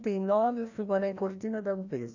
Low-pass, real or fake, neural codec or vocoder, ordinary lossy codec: 7.2 kHz; fake; codec, 16 kHz, 1 kbps, FreqCodec, larger model; none